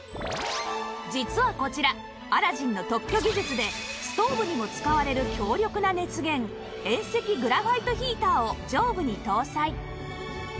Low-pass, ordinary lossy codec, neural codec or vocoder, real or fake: none; none; none; real